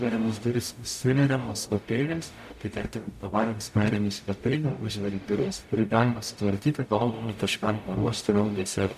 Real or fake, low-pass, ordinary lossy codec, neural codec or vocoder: fake; 14.4 kHz; AAC, 96 kbps; codec, 44.1 kHz, 0.9 kbps, DAC